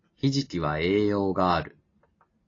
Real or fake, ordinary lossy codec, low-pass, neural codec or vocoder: real; AAC, 32 kbps; 7.2 kHz; none